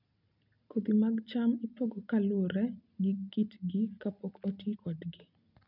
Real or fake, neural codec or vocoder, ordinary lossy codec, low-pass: real; none; none; 5.4 kHz